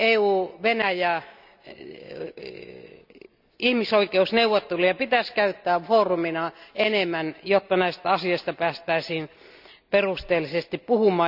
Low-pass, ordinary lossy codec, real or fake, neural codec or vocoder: 5.4 kHz; none; real; none